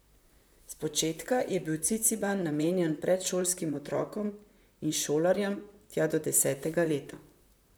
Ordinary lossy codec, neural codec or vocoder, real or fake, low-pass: none; vocoder, 44.1 kHz, 128 mel bands, Pupu-Vocoder; fake; none